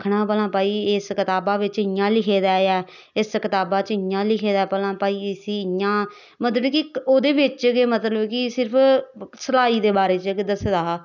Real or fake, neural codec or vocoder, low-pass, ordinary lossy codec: real; none; 7.2 kHz; none